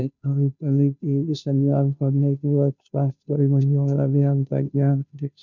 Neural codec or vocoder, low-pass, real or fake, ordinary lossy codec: codec, 16 kHz, 0.5 kbps, FunCodec, trained on Chinese and English, 25 frames a second; 7.2 kHz; fake; none